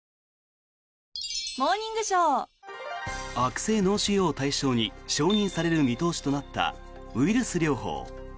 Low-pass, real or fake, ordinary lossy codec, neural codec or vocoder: none; real; none; none